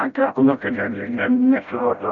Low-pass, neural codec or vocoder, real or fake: 7.2 kHz; codec, 16 kHz, 0.5 kbps, FreqCodec, smaller model; fake